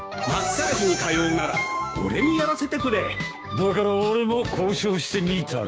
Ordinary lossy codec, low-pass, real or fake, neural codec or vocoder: none; none; fake; codec, 16 kHz, 6 kbps, DAC